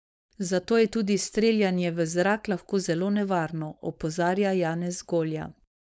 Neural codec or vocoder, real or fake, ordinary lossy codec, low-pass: codec, 16 kHz, 4.8 kbps, FACodec; fake; none; none